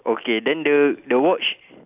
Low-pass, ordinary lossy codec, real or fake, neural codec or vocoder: 3.6 kHz; none; real; none